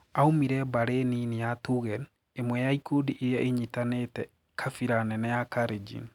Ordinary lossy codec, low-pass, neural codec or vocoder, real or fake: none; 19.8 kHz; none; real